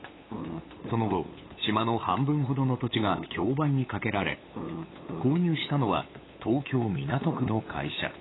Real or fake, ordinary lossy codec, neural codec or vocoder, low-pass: fake; AAC, 16 kbps; codec, 16 kHz, 8 kbps, FunCodec, trained on LibriTTS, 25 frames a second; 7.2 kHz